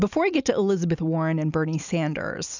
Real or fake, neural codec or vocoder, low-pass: real; none; 7.2 kHz